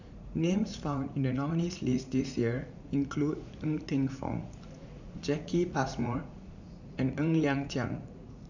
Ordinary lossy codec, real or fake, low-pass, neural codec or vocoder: none; fake; 7.2 kHz; vocoder, 44.1 kHz, 80 mel bands, Vocos